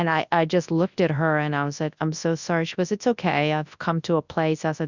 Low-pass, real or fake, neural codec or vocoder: 7.2 kHz; fake; codec, 24 kHz, 0.9 kbps, WavTokenizer, large speech release